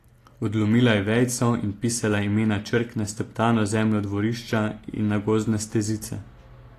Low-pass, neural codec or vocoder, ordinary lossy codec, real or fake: 14.4 kHz; none; AAC, 48 kbps; real